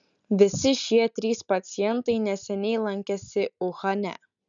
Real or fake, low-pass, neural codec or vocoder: real; 7.2 kHz; none